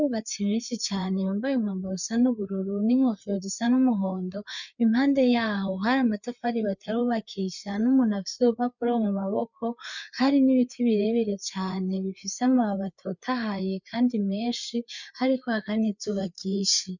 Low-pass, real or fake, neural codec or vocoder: 7.2 kHz; fake; codec, 16 kHz, 4 kbps, FreqCodec, larger model